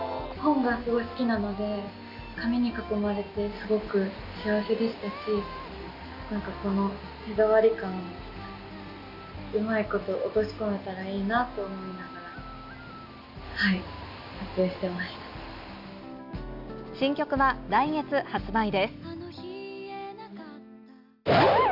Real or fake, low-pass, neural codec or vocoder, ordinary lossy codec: real; 5.4 kHz; none; none